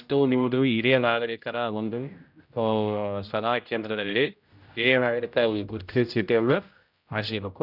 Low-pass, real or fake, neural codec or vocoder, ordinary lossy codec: 5.4 kHz; fake; codec, 16 kHz, 0.5 kbps, X-Codec, HuBERT features, trained on general audio; none